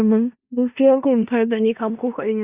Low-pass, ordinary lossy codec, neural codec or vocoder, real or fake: 3.6 kHz; Opus, 64 kbps; codec, 16 kHz in and 24 kHz out, 0.4 kbps, LongCat-Audio-Codec, four codebook decoder; fake